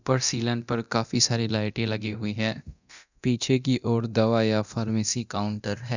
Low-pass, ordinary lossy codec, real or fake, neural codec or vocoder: 7.2 kHz; none; fake; codec, 24 kHz, 0.9 kbps, DualCodec